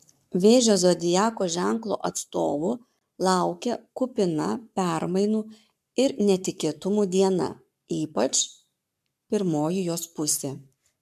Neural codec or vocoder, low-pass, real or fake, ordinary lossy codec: codec, 44.1 kHz, 7.8 kbps, Pupu-Codec; 14.4 kHz; fake; MP3, 96 kbps